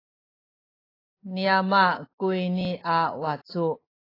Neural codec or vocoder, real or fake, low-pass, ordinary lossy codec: none; real; 5.4 kHz; AAC, 24 kbps